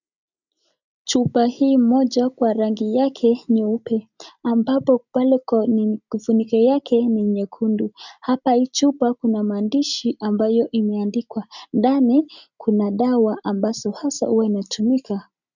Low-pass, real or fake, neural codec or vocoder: 7.2 kHz; real; none